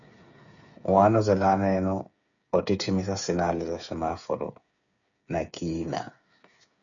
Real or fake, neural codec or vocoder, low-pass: fake; codec, 16 kHz, 8 kbps, FreqCodec, smaller model; 7.2 kHz